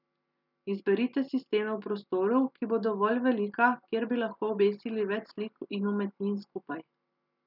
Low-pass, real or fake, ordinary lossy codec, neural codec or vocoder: 5.4 kHz; real; none; none